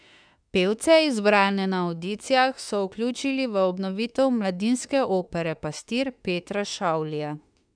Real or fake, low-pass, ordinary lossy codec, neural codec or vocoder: fake; 9.9 kHz; none; autoencoder, 48 kHz, 32 numbers a frame, DAC-VAE, trained on Japanese speech